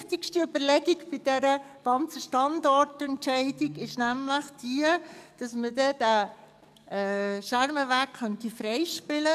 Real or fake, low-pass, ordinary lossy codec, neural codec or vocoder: fake; 14.4 kHz; none; codec, 44.1 kHz, 7.8 kbps, DAC